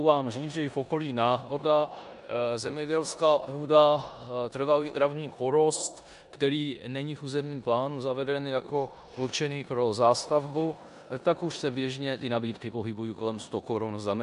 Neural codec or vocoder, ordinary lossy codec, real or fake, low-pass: codec, 16 kHz in and 24 kHz out, 0.9 kbps, LongCat-Audio-Codec, four codebook decoder; AAC, 96 kbps; fake; 10.8 kHz